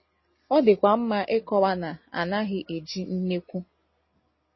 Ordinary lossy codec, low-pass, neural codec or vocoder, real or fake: MP3, 24 kbps; 7.2 kHz; none; real